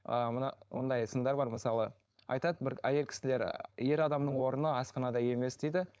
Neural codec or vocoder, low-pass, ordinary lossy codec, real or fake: codec, 16 kHz, 4.8 kbps, FACodec; none; none; fake